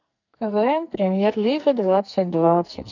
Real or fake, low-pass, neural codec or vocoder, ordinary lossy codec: fake; 7.2 kHz; codec, 44.1 kHz, 2.6 kbps, SNAC; none